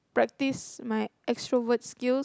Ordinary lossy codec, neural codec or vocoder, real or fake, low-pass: none; none; real; none